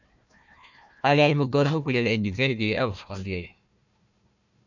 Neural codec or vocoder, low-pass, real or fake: codec, 16 kHz, 1 kbps, FunCodec, trained on Chinese and English, 50 frames a second; 7.2 kHz; fake